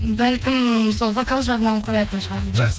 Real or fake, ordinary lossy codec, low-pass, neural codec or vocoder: fake; none; none; codec, 16 kHz, 2 kbps, FreqCodec, smaller model